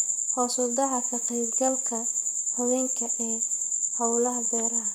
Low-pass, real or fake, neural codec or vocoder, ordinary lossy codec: none; fake; vocoder, 44.1 kHz, 128 mel bands, Pupu-Vocoder; none